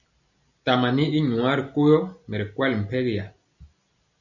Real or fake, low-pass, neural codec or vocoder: real; 7.2 kHz; none